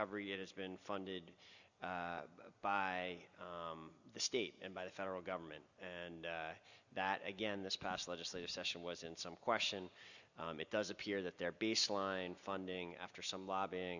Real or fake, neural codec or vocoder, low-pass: real; none; 7.2 kHz